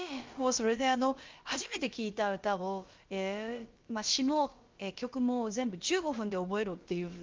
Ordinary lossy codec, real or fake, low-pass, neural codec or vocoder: Opus, 32 kbps; fake; 7.2 kHz; codec, 16 kHz, about 1 kbps, DyCAST, with the encoder's durations